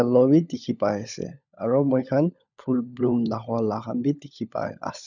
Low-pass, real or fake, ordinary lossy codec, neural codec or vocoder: 7.2 kHz; fake; none; codec, 16 kHz, 16 kbps, FunCodec, trained on LibriTTS, 50 frames a second